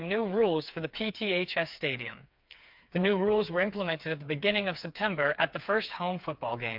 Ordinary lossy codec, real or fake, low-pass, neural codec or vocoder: MP3, 48 kbps; fake; 5.4 kHz; codec, 16 kHz, 4 kbps, FreqCodec, smaller model